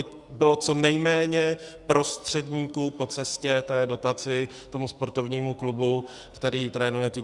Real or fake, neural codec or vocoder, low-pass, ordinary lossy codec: fake; codec, 44.1 kHz, 2.6 kbps, SNAC; 10.8 kHz; Opus, 64 kbps